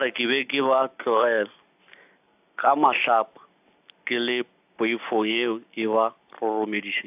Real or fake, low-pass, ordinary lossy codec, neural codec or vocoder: real; 3.6 kHz; AAC, 32 kbps; none